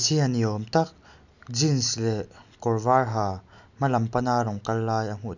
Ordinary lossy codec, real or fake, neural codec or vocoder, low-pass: none; real; none; 7.2 kHz